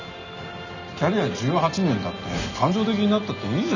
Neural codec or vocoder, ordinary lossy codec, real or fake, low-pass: none; none; real; 7.2 kHz